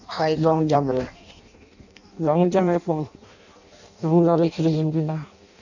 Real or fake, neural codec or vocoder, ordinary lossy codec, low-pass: fake; codec, 16 kHz in and 24 kHz out, 0.6 kbps, FireRedTTS-2 codec; none; 7.2 kHz